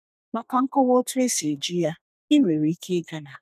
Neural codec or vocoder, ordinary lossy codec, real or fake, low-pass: codec, 32 kHz, 1.9 kbps, SNAC; none; fake; 14.4 kHz